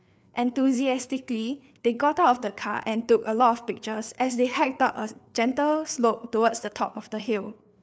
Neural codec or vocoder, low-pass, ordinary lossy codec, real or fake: codec, 16 kHz, 4 kbps, FreqCodec, larger model; none; none; fake